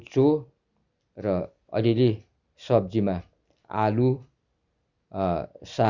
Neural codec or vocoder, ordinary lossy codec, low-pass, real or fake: none; Opus, 64 kbps; 7.2 kHz; real